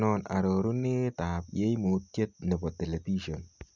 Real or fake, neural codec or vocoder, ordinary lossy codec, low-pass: real; none; none; 7.2 kHz